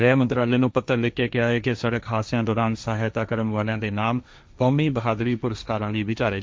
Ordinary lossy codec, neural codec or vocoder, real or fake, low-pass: none; codec, 16 kHz, 1.1 kbps, Voila-Tokenizer; fake; none